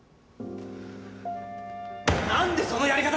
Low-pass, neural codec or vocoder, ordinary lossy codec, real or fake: none; none; none; real